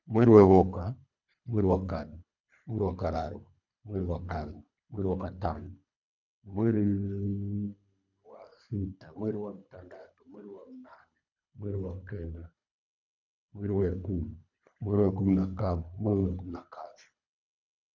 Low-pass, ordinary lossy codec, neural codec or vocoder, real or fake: 7.2 kHz; none; codec, 24 kHz, 3 kbps, HILCodec; fake